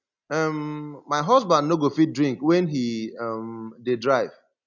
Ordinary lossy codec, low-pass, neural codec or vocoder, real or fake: none; 7.2 kHz; none; real